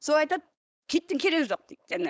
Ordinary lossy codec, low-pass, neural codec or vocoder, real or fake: none; none; codec, 16 kHz, 4.8 kbps, FACodec; fake